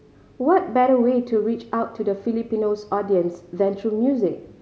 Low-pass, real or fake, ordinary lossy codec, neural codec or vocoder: none; real; none; none